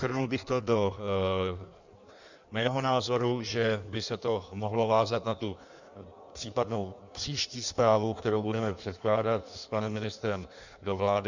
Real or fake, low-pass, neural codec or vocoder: fake; 7.2 kHz; codec, 16 kHz in and 24 kHz out, 1.1 kbps, FireRedTTS-2 codec